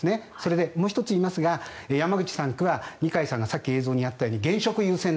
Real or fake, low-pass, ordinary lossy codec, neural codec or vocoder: real; none; none; none